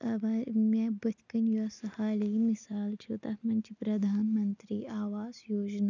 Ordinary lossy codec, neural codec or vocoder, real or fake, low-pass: none; none; real; 7.2 kHz